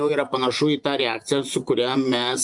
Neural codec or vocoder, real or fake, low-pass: vocoder, 24 kHz, 100 mel bands, Vocos; fake; 10.8 kHz